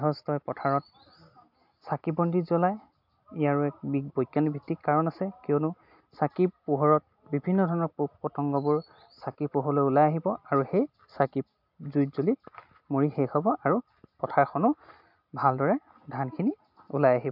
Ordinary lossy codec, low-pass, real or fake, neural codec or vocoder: MP3, 48 kbps; 5.4 kHz; real; none